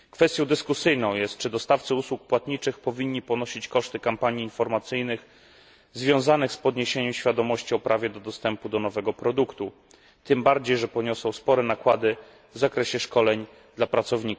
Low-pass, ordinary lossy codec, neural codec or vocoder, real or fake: none; none; none; real